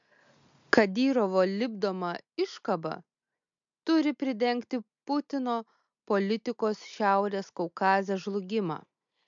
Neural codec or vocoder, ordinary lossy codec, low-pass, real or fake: none; MP3, 64 kbps; 7.2 kHz; real